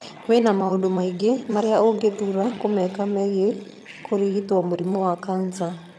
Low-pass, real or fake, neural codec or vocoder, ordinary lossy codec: none; fake; vocoder, 22.05 kHz, 80 mel bands, HiFi-GAN; none